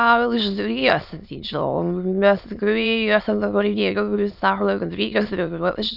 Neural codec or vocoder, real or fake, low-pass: autoencoder, 22.05 kHz, a latent of 192 numbers a frame, VITS, trained on many speakers; fake; 5.4 kHz